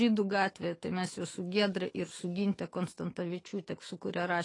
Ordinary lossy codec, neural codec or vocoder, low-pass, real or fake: AAC, 32 kbps; autoencoder, 48 kHz, 128 numbers a frame, DAC-VAE, trained on Japanese speech; 10.8 kHz; fake